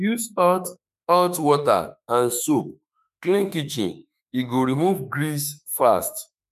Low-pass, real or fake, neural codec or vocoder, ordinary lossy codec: 14.4 kHz; fake; autoencoder, 48 kHz, 32 numbers a frame, DAC-VAE, trained on Japanese speech; none